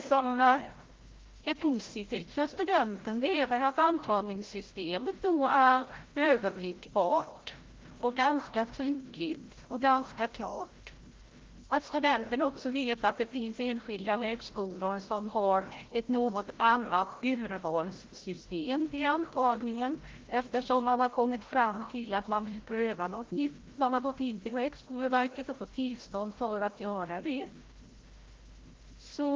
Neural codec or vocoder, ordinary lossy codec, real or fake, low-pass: codec, 16 kHz, 0.5 kbps, FreqCodec, larger model; Opus, 16 kbps; fake; 7.2 kHz